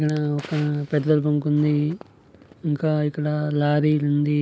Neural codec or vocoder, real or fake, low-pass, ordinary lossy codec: none; real; none; none